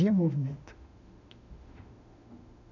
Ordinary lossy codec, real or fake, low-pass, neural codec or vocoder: none; fake; 7.2 kHz; autoencoder, 48 kHz, 32 numbers a frame, DAC-VAE, trained on Japanese speech